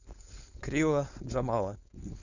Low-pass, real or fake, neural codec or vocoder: 7.2 kHz; fake; codec, 16 kHz, 4.8 kbps, FACodec